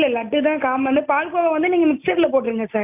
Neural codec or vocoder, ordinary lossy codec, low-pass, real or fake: none; none; 3.6 kHz; real